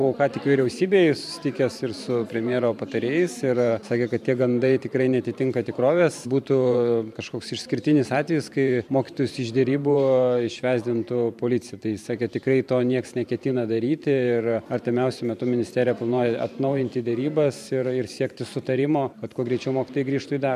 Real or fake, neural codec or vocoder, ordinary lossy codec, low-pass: fake; vocoder, 44.1 kHz, 128 mel bands every 512 samples, BigVGAN v2; MP3, 96 kbps; 14.4 kHz